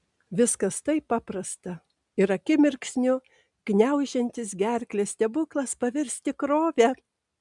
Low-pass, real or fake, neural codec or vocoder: 10.8 kHz; real; none